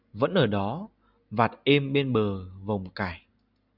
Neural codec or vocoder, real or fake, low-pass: none; real; 5.4 kHz